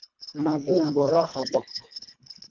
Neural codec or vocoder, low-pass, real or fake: codec, 24 kHz, 1.5 kbps, HILCodec; 7.2 kHz; fake